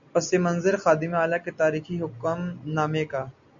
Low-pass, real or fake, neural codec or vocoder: 7.2 kHz; real; none